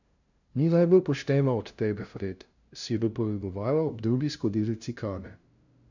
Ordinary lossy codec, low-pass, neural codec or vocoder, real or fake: none; 7.2 kHz; codec, 16 kHz, 0.5 kbps, FunCodec, trained on LibriTTS, 25 frames a second; fake